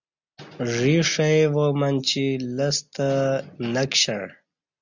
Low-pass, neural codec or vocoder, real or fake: 7.2 kHz; none; real